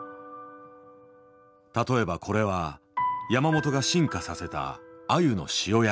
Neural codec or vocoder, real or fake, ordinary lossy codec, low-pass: none; real; none; none